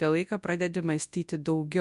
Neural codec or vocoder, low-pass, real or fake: codec, 24 kHz, 0.9 kbps, WavTokenizer, large speech release; 10.8 kHz; fake